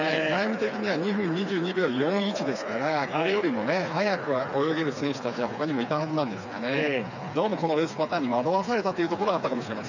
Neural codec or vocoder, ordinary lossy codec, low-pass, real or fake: codec, 16 kHz, 4 kbps, FreqCodec, smaller model; none; 7.2 kHz; fake